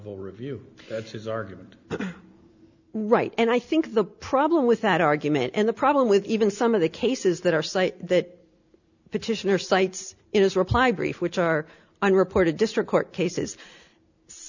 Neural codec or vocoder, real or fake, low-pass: none; real; 7.2 kHz